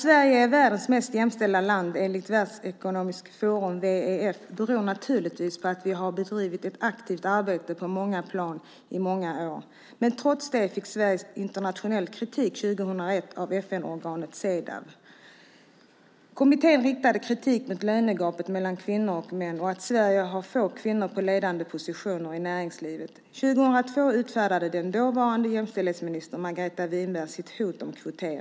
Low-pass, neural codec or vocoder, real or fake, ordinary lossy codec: none; none; real; none